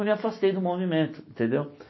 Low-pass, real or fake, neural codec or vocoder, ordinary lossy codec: 7.2 kHz; real; none; MP3, 24 kbps